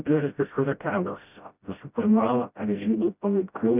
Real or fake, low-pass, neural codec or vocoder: fake; 3.6 kHz; codec, 16 kHz, 0.5 kbps, FreqCodec, smaller model